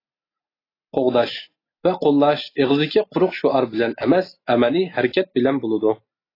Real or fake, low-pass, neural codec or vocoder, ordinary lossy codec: real; 5.4 kHz; none; AAC, 24 kbps